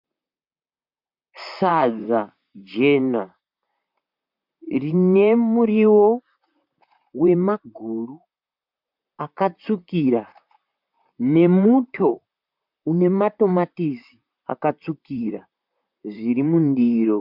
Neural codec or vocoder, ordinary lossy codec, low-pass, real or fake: vocoder, 44.1 kHz, 128 mel bands, Pupu-Vocoder; MP3, 48 kbps; 5.4 kHz; fake